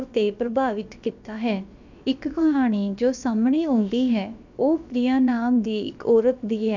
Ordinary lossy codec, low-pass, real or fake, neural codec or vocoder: none; 7.2 kHz; fake; codec, 16 kHz, about 1 kbps, DyCAST, with the encoder's durations